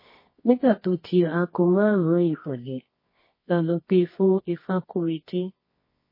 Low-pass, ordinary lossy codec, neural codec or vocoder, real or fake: 5.4 kHz; MP3, 24 kbps; codec, 24 kHz, 0.9 kbps, WavTokenizer, medium music audio release; fake